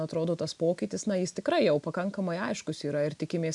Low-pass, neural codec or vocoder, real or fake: 10.8 kHz; none; real